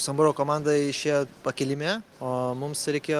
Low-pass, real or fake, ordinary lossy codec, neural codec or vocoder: 14.4 kHz; real; Opus, 24 kbps; none